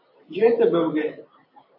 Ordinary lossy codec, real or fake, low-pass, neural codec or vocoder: MP3, 32 kbps; fake; 5.4 kHz; vocoder, 44.1 kHz, 128 mel bands every 512 samples, BigVGAN v2